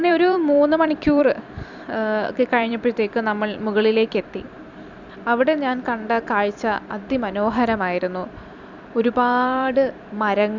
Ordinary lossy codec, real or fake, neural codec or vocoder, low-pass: none; fake; vocoder, 44.1 kHz, 128 mel bands every 256 samples, BigVGAN v2; 7.2 kHz